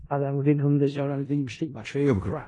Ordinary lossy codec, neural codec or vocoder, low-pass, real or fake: AAC, 32 kbps; codec, 16 kHz in and 24 kHz out, 0.4 kbps, LongCat-Audio-Codec, four codebook decoder; 10.8 kHz; fake